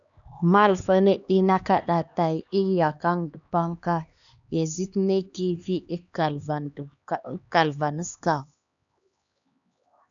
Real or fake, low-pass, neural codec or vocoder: fake; 7.2 kHz; codec, 16 kHz, 2 kbps, X-Codec, HuBERT features, trained on LibriSpeech